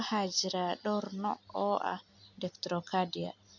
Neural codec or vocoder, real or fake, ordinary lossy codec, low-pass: none; real; none; 7.2 kHz